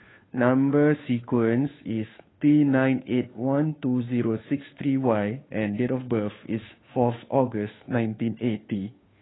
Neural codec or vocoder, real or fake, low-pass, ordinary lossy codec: codec, 16 kHz, 2 kbps, FunCodec, trained on Chinese and English, 25 frames a second; fake; 7.2 kHz; AAC, 16 kbps